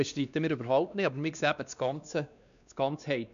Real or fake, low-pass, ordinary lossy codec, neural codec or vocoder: fake; 7.2 kHz; none; codec, 16 kHz, 2 kbps, X-Codec, WavLM features, trained on Multilingual LibriSpeech